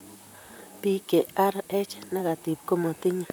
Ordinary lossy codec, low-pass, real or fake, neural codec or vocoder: none; none; fake; vocoder, 44.1 kHz, 128 mel bands, Pupu-Vocoder